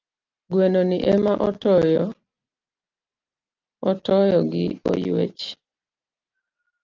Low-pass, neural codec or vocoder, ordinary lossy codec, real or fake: 7.2 kHz; none; Opus, 24 kbps; real